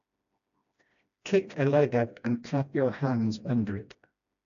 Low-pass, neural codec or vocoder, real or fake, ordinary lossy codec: 7.2 kHz; codec, 16 kHz, 1 kbps, FreqCodec, smaller model; fake; AAC, 64 kbps